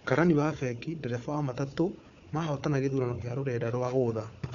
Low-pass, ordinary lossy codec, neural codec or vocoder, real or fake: 7.2 kHz; MP3, 96 kbps; codec, 16 kHz, 4 kbps, FunCodec, trained on Chinese and English, 50 frames a second; fake